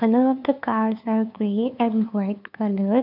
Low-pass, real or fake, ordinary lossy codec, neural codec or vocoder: 5.4 kHz; fake; none; codec, 16 kHz, 2 kbps, FunCodec, trained on LibriTTS, 25 frames a second